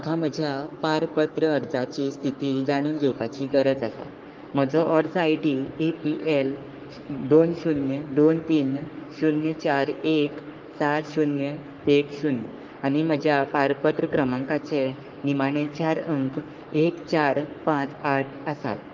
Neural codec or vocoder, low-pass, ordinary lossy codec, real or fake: codec, 44.1 kHz, 3.4 kbps, Pupu-Codec; 7.2 kHz; Opus, 24 kbps; fake